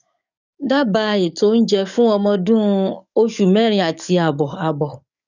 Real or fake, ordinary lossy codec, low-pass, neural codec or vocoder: fake; none; 7.2 kHz; codec, 44.1 kHz, 7.8 kbps, DAC